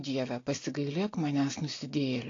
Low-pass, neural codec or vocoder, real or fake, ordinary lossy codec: 7.2 kHz; none; real; AAC, 32 kbps